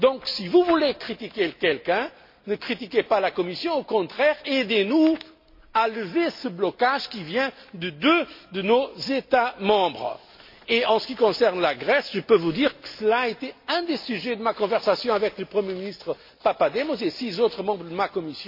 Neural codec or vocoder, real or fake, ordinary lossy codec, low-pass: none; real; MP3, 32 kbps; 5.4 kHz